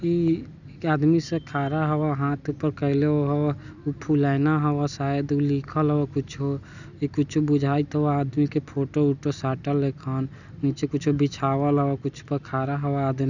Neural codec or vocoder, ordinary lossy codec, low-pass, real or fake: none; none; 7.2 kHz; real